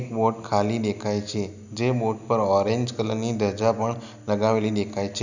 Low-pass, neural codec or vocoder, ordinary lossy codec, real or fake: 7.2 kHz; none; none; real